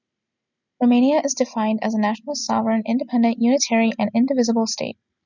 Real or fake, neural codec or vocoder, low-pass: real; none; 7.2 kHz